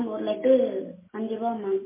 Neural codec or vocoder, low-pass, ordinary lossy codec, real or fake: none; 3.6 kHz; MP3, 16 kbps; real